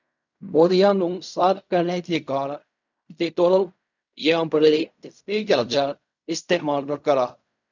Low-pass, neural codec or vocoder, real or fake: 7.2 kHz; codec, 16 kHz in and 24 kHz out, 0.4 kbps, LongCat-Audio-Codec, fine tuned four codebook decoder; fake